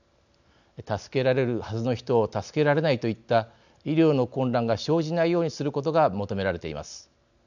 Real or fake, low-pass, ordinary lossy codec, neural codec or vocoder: real; 7.2 kHz; none; none